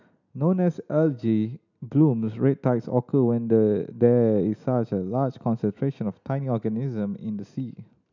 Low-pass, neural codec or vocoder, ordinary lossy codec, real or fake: 7.2 kHz; none; none; real